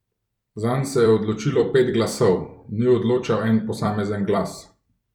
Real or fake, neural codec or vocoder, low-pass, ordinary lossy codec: fake; vocoder, 44.1 kHz, 128 mel bands every 256 samples, BigVGAN v2; 19.8 kHz; none